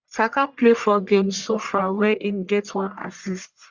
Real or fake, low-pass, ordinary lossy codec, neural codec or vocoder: fake; 7.2 kHz; Opus, 64 kbps; codec, 44.1 kHz, 1.7 kbps, Pupu-Codec